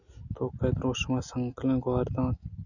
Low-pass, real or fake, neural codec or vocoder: 7.2 kHz; real; none